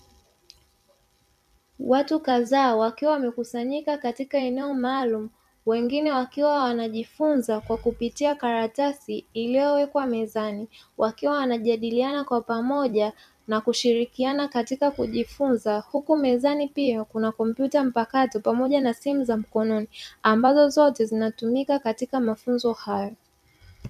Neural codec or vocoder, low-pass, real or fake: vocoder, 44.1 kHz, 128 mel bands every 256 samples, BigVGAN v2; 14.4 kHz; fake